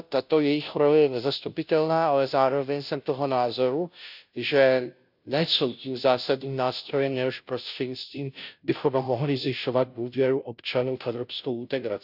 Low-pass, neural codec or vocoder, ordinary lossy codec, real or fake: 5.4 kHz; codec, 16 kHz, 0.5 kbps, FunCodec, trained on Chinese and English, 25 frames a second; none; fake